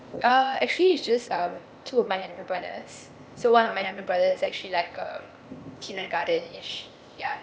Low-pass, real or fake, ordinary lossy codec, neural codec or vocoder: none; fake; none; codec, 16 kHz, 0.8 kbps, ZipCodec